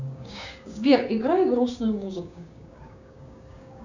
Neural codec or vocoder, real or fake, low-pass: codec, 16 kHz, 6 kbps, DAC; fake; 7.2 kHz